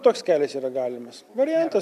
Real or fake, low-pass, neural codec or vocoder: real; 14.4 kHz; none